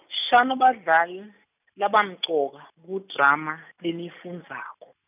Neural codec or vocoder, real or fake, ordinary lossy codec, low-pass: none; real; none; 3.6 kHz